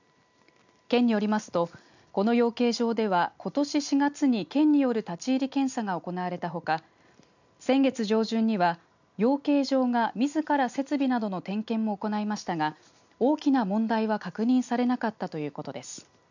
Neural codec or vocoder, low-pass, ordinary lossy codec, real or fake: none; 7.2 kHz; none; real